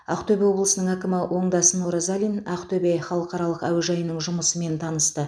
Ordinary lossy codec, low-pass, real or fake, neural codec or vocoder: none; none; real; none